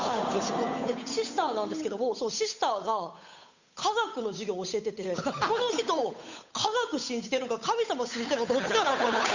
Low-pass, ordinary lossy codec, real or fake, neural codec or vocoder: 7.2 kHz; none; fake; codec, 16 kHz, 8 kbps, FunCodec, trained on Chinese and English, 25 frames a second